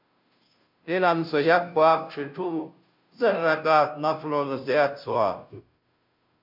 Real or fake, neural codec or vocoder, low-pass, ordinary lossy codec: fake; codec, 16 kHz, 0.5 kbps, FunCodec, trained on Chinese and English, 25 frames a second; 5.4 kHz; MP3, 48 kbps